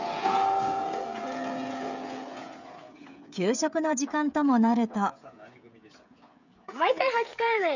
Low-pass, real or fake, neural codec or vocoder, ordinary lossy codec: 7.2 kHz; fake; codec, 16 kHz, 16 kbps, FreqCodec, smaller model; none